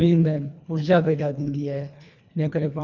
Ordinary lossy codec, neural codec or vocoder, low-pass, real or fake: none; codec, 24 kHz, 1.5 kbps, HILCodec; 7.2 kHz; fake